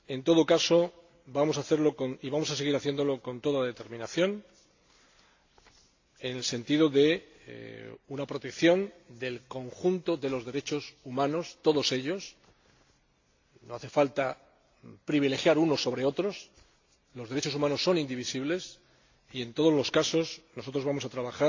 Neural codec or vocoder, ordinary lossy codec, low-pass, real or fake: none; AAC, 48 kbps; 7.2 kHz; real